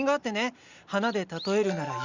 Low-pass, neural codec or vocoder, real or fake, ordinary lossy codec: 7.2 kHz; none; real; Opus, 64 kbps